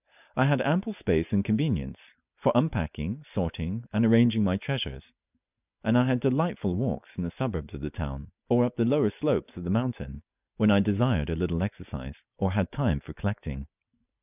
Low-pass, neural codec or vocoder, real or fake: 3.6 kHz; none; real